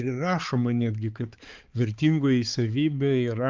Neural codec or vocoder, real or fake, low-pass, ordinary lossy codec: codec, 16 kHz, 4 kbps, X-Codec, HuBERT features, trained on balanced general audio; fake; 7.2 kHz; Opus, 32 kbps